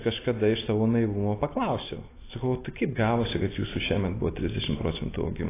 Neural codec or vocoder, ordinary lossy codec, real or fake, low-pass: none; AAC, 16 kbps; real; 3.6 kHz